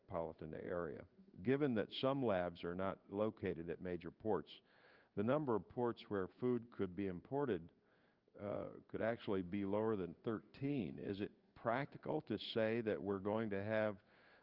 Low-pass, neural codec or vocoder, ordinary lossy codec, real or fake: 5.4 kHz; codec, 16 kHz in and 24 kHz out, 1 kbps, XY-Tokenizer; Opus, 24 kbps; fake